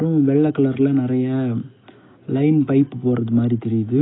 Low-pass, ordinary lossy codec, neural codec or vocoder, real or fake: 7.2 kHz; AAC, 16 kbps; none; real